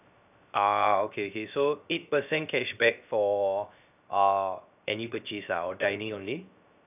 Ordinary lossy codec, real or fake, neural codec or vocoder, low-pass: none; fake; codec, 16 kHz, 0.3 kbps, FocalCodec; 3.6 kHz